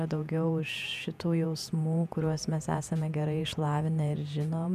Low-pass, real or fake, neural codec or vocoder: 14.4 kHz; fake; vocoder, 48 kHz, 128 mel bands, Vocos